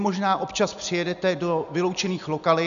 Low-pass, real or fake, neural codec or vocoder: 7.2 kHz; real; none